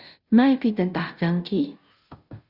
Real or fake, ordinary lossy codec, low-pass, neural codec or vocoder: fake; Opus, 64 kbps; 5.4 kHz; codec, 16 kHz, 0.5 kbps, FunCodec, trained on Chinese and English, 25 frames a second